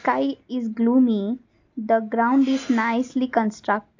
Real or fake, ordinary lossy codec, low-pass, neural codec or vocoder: real; AAC, 48 kbps; 7.2 kHz; none